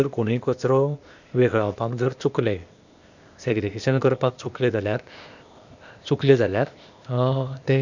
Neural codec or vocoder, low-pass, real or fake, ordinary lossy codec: codec, 16 kHz, 0.8 kbps, ZipCodec; 7.2 kHz; fake; none